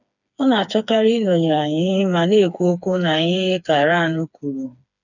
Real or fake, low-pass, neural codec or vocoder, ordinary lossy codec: fake; 7.2 kHz; codec, 16 kHz, 4 kbps, FreqCodec, smaller model; none